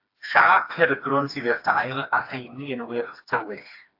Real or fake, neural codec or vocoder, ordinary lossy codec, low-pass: fake; codec, 16 kHz, 2 kbps, FreqCodec, smaller model; AAC, 32 kbps; 5.4 kHz